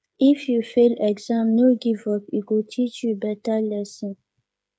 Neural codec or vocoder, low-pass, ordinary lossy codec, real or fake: codec, 16 kHz, 8 kbps, FreqCodec, smaller model; none; none; fake